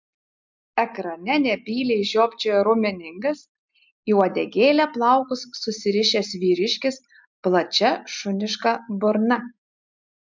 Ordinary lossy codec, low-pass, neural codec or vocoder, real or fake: MP3, 64 kbps; 7.2 kHz; none; real